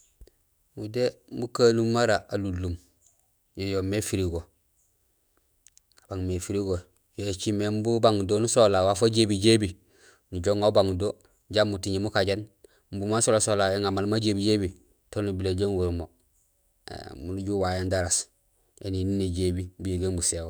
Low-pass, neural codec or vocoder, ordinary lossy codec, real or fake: none; autoencoder, 48 kHz, 128 numbers a frame, DAC-VAE, trained on Japanese speech; none; fake